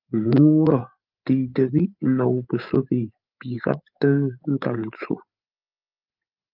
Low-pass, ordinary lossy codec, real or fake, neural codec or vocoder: 5.4 kHz; Opus, 24 kbps; fake; codec, 16 kHz, 8 kbps, FreqCodec, larger model